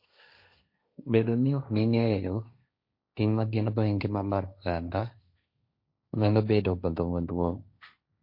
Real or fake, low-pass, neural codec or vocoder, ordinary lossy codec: fake; 5.4 kHz; codec, 16 kHz, 1.1 kbps, Voila-Tokenizer; MP3, 32 kbps